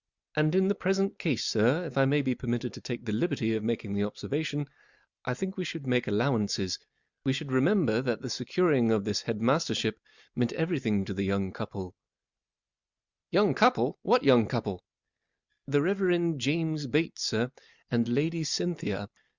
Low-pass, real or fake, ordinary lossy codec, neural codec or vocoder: 7.2 kHz; real; Opus, 64 kbps; none